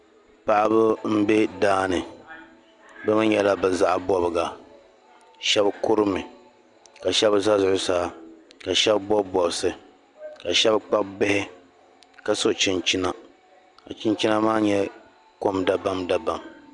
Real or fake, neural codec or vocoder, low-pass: real; none; 10.8 kHz